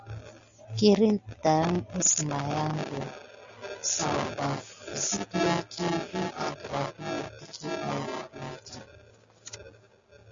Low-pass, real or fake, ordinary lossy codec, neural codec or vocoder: 7.2 kHz; real; Opus, 64 kbps; none